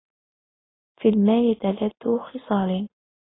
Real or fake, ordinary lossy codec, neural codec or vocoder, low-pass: real; AAC, 16 kbps; none; 7.2 kHz